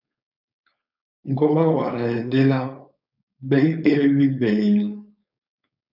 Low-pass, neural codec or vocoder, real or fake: 5.4 kHz; codec, 16 kHz, 4.8 kbps, FACodec; fake